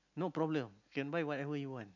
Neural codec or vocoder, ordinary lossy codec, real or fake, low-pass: none; none; real; 7.2 kHz